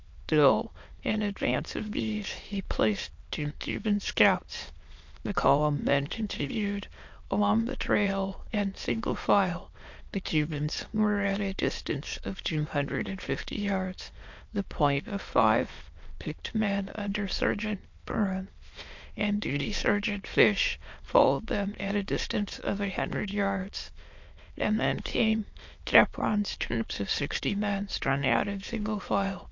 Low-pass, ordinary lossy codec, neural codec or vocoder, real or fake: 7.2 kHz; MP3, 48 kbps; autoencoder, 22.05 kHz, a latent of 192 numbers a frame, VITS, trained on many speakers; fake